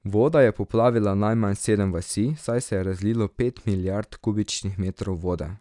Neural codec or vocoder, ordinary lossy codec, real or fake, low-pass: none; none; real; 10.8 kHz